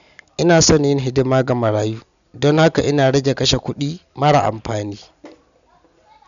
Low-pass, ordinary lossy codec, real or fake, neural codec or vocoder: 7.2 kHz; none; real; none